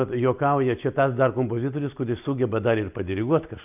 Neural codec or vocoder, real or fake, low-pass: none; real; 3.6 kHz